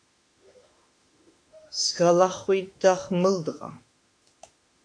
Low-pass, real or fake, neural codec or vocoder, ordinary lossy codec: 9.9 kHz; fake; autoencoder, 48 kHz, 32 numbers a frame, DAC-VAE, trained on Japanese speech; AAC, 48 kbps